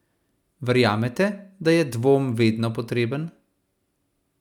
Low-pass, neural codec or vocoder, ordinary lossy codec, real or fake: 19.8 kHz; none; none; real